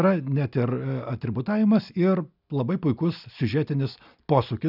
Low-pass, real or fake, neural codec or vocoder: 5.4 kHz; real; none